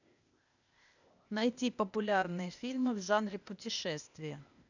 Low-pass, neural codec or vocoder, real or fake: 7.2 kHz; codec, 16 kHz, 0.8 kbps, ZipCodec; fake